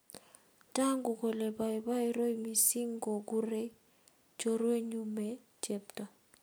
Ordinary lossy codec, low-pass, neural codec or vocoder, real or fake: none; none; none; real